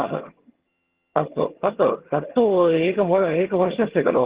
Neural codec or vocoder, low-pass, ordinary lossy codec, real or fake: vocoder, 22.05 kHz, 80 mel bands, HiFi-GAN; 3.6 kHz; Opus, 16 kbps; fake